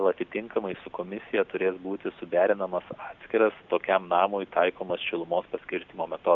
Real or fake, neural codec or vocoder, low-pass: real; none; 7.2 kHz